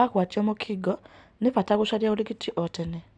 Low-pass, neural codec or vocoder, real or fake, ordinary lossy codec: 9.9 kHz; none; real; none